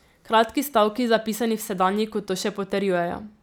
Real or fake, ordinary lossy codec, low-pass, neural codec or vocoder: real; none; none; none